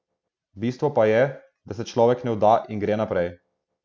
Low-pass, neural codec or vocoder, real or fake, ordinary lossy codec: none; none; real; none